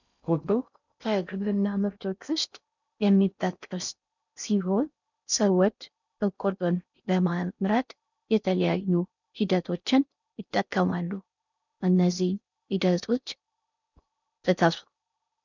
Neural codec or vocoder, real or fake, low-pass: codec, 16 kHz in and 24 kHz out, 0.6 kbps, FocalCodec, streaming, 4096 codes; fake; 7.2 kHz